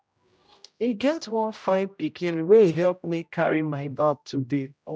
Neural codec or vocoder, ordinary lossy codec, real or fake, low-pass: codec, 16 kHz, 0.5 kbps, X-Codec, HuBERT features, trained on general audio; none; fake; none